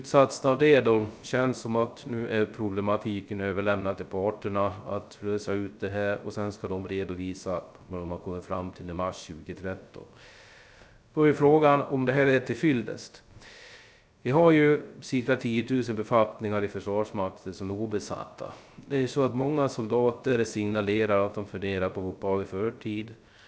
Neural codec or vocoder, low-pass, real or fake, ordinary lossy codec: codec, 16 kHz, 0.3 kbps, FocalCodec; none; fake; none